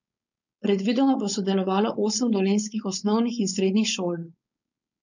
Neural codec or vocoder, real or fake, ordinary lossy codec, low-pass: codec, 16 kHz, 4.8 kbps, FACodec; fake; none; 7.2 kHz